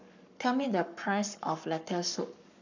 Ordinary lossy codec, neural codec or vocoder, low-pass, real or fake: none; codec, 44.1 kHz, 7.8 kbps, Pupu-Codec; 7.2 kHz; fake